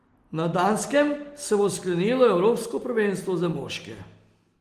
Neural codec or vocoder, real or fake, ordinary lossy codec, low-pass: none; real; Opus, 24 kbps; 14.4 kHz